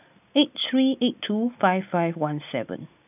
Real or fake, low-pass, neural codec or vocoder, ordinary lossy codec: real; 3.6 kHz; none; none